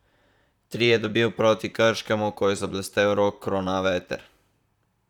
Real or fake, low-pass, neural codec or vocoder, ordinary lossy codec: fake; 19.8 kHz; vocoder, 44.1 kHz, 128 mel bands, Pupu-Vocoder; none